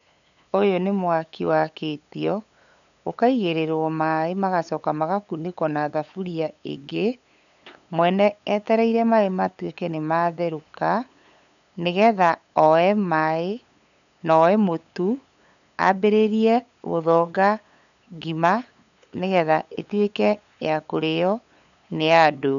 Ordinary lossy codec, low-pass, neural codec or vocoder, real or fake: none; 7.2 kHz; codec, 16 kHz, 8 kbps, FunCodec, trained on LibriTTS, 25 frames a second; fake